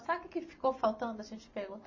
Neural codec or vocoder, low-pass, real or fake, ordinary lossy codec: none; 7.2 kHz; real; MP3, 32 kbps